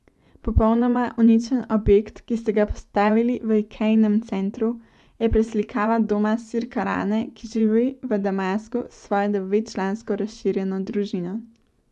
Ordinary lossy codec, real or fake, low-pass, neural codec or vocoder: none; fake; none; vocoder, 24 kHz, 100 mel bands, Vocos